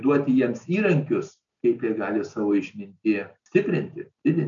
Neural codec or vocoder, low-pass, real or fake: none; 7.2 kHz; real